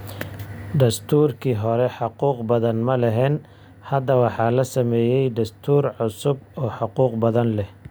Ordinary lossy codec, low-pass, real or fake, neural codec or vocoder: none; none; real; none